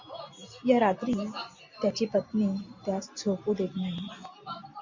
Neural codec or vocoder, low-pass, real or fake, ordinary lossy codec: none; 7.2 kHz; real; MP3, 64 kbps